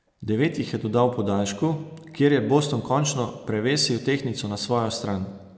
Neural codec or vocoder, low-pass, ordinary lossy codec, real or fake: none; none; none; real